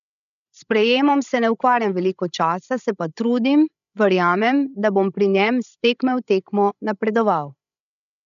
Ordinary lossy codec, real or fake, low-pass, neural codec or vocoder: none; fake; 7.2 kHz; codec, 16 kHz, 16 kbps, FreqCodec, larger model